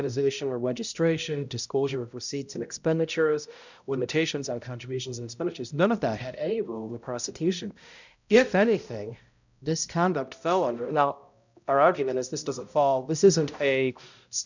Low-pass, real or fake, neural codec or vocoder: 7.2 kHz; fake; codec, 16 kHz, 0.5 kbps, X-Codec, HuBERT features, trained on balanced general audio